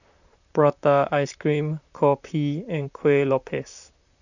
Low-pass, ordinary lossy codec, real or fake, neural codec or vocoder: 7.2 kHz; none; fake; vocoder, 44.1 kHz, 128 mel bands, Pupu-Vocoder